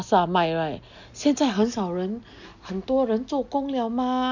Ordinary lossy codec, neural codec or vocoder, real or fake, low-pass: none; none; real; 7.2 kHz